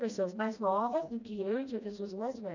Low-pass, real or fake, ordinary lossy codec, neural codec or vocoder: 7.2 kHz; fake; none; codec, 16 kHz, 1 kbps, FreqCodec, smaller model